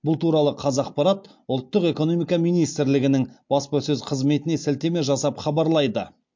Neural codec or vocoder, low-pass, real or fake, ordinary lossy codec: none; 7.2 kHz; real; MP3, 48 kbps